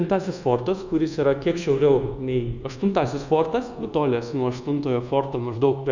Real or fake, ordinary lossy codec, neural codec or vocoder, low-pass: fake; Opus, 64 kbps; codec, 24 kHz, 1.2 kbps, DualCodec; 7.2 kHz